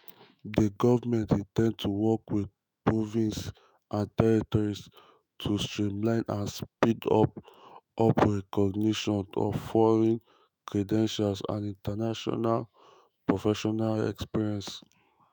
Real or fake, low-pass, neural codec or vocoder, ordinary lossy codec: fake; none; autoencoder, 48 kHz, 128 numbers a frame, DAC-VAE, trained on Japanese speech; none